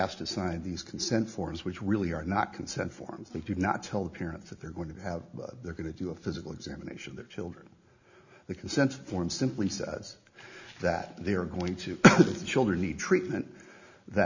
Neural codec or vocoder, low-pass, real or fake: none; 7.2 kHz; real